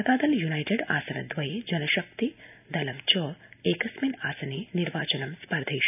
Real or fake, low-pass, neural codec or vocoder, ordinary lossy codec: real; 3.6 kHz; none; none